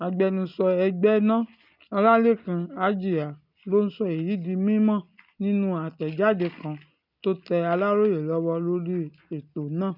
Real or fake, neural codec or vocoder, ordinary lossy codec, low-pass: real; none; none; 5.4 kHz